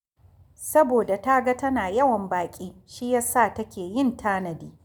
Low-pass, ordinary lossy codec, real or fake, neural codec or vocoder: none; none; real; none